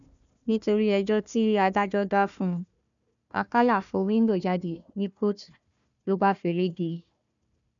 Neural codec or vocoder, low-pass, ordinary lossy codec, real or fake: codec, 16 kHz, 1 kbps, FunCodec, trained on Chinese and English, 50 frames a second; 7.2 kHz; none; fake